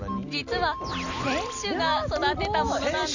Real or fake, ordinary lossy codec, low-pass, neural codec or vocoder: real; Opus, 64 kbps; 7.2 kHz; none